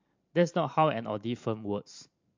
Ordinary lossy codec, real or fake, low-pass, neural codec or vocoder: MP3, 48 kbps; fake; 7.2 kHz; vocoder, 44.1 kHz, 128 mel bands every 512 samples, BigVGAN v2